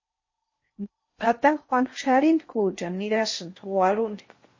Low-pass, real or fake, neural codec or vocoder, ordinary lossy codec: 7.2 kHz; fake; codec, 16 kHz in and 24 kHz out, 0.6 kbps, FocalCodec, streaming, 4096 codes; MP3, 32 kbps